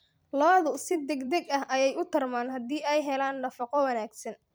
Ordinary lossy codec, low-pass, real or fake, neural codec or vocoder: none; none; fake; vocoder, 44.1 kHz, 128 mel bands every 256 samples, BigVGAN v2